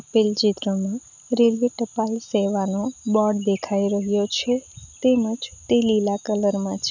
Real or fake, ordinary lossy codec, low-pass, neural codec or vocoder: real; none; 7.2 kHz; none